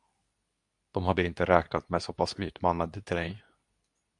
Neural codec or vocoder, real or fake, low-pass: codec, 24 kHz, 0.9 kbps, WavTokenizer, medium speech release version 2; fake; 10.8 kHz